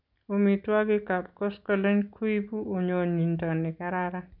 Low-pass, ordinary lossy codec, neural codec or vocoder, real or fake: 5.4 kHz; none; none; real